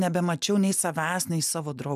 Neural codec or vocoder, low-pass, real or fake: none; 14.4 kHz; real